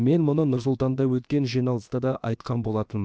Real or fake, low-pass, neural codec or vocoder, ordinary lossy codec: fake; none; codec, 16 kHz, about 1 kbps, DyCAST, with the encoder's durations; none